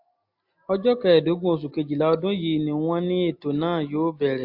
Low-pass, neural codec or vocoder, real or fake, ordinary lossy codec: 5.4 kHz; none; real; AAC, 48 kbps